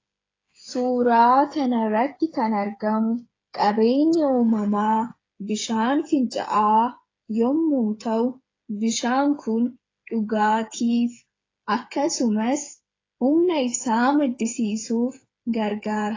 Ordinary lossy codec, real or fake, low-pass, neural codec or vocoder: AAC, 32 kbps; fake; 7.2 kHz; codec, 16 kHz, 8 kbps, FreqCodec, smaller model